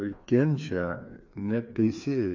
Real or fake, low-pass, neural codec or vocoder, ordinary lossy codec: fake; 7.2 kHz; codec, 16 kHz, 2 kbps, FreqCodec, larger model; AAC, 48 kbps